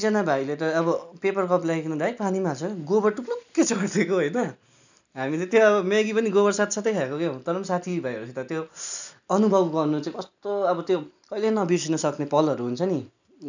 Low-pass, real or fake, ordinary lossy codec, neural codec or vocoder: 7.2 kHz; real; none; none